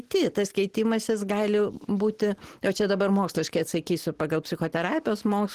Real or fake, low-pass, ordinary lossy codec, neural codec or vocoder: real; 14.4 kHz; Opus, 16 kbps; none